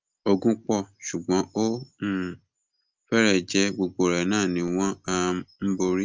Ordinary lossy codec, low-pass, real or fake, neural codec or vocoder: Opus, 24 kbps; 7.2 kHz; real; none